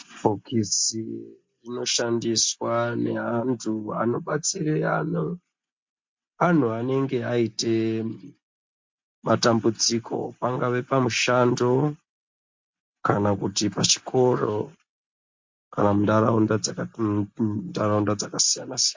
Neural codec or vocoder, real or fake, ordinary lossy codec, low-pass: none; real; MP3, 48 kbps; 7.2 kHz